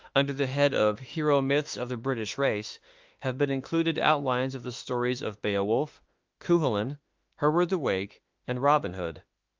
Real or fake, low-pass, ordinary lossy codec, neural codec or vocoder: fake; 7.2 kHz; Opus, 24 kbps; autoencoder, 48 kHz, 32 numbers a frame, DAC-VAE, trained on Japanese speech